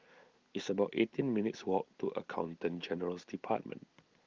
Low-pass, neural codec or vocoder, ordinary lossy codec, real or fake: 7.2 kHz; none; Opus, 32 kbps; real